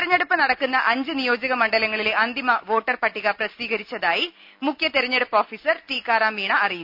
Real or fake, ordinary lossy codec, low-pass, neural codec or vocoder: real; none; 5.4 kHz; none